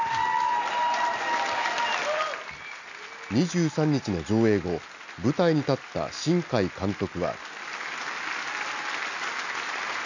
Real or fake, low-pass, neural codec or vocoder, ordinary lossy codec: real; 7.2 kHz; none; none